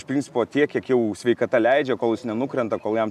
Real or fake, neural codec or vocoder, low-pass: real; none; 14.4 kHz